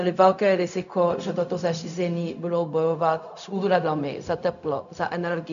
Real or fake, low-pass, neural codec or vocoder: fake; 7.2 kHz; codec, 16 kHz, 0.4 kbps, LongCat-Audio-Codec